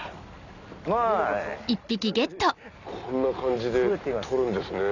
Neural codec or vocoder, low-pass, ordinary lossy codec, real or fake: none; 7.2 kHz; none; real